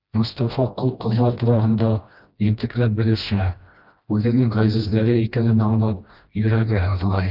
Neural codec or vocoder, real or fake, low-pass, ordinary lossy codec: codec, 16 kHz, 1 kbps, FreqCodec, smaller model; fake; 5.4 kHz; Opus, 24 kbps